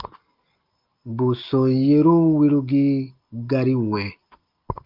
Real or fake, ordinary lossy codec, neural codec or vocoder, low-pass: real; Opus, 32 kbps; none; 5.4 kHz